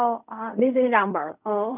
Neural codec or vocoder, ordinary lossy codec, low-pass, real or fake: codec, 16 kHz in and 24 kHz out, 0.4 kbps, LongCat-Audio-Codec, fine tuned four codebook decoder; none; 3.6 kHz; fake